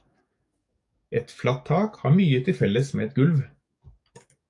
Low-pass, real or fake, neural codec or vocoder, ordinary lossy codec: 10.8 kHz; fake; codec, 44.1 kHz, 7.8 kbps, DAC; AAC, 64 kbps